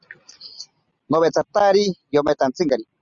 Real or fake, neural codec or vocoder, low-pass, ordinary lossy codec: real; none; 7.2 kHz; Opus, 64 kbps